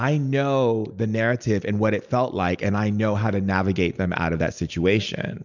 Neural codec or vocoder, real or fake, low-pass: none; real; 7.2 kHz